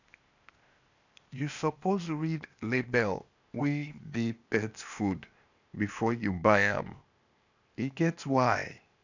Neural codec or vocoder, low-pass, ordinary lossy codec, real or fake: codec, 16 kHz, 0.8 kbps, ZipCodec; 7.2 kHz; none; fake